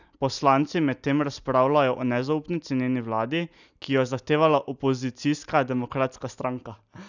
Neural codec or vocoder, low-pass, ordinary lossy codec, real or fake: none; 7.2 kHz; none; real